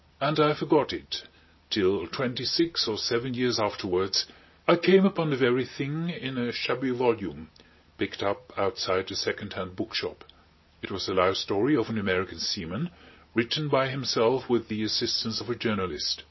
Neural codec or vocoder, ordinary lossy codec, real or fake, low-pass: none; MP3, 24 kbps; real; 7.2 kHz